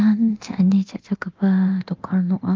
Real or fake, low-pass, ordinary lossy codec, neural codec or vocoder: fake; 7.2 kHz; Opus, 32 kbps; codec, 24 kHz, 0.9 kbps, DualCodec